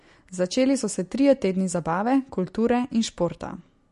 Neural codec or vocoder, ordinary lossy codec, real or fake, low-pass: none; MP3, 48 kbps; real; 14.4 kHz